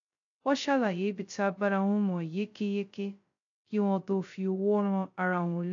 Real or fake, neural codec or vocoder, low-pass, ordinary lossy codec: fake; codec, 16 kHz, 0.2 kbps, FocalCodec; 7.2 kHz; AAC, 48 kbps